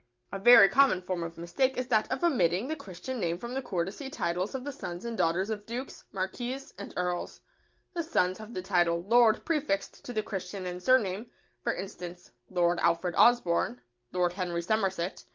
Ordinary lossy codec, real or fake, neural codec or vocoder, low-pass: Opus, 24 kbps; real; none; 7.2 kHz